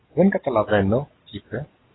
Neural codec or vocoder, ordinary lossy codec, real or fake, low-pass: none; AAC, 16 kbps; real; 7.2 kHz